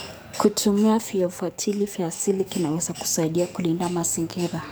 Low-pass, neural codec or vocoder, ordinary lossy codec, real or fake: none; codec, 44.1 kHz, 7.8 kbps, DAC; none; fake